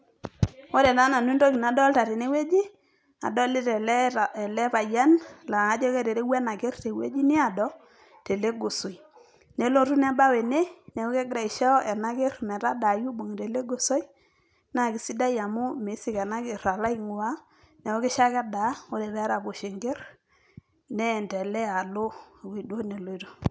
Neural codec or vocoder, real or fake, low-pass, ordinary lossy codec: none; real; none; none